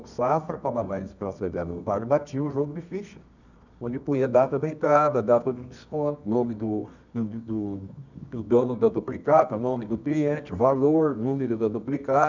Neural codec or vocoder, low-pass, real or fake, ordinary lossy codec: codec, 24 kHz, 0.9 kbps, WavTokenizer, medium music audio release; 7.2 kHz; fake; none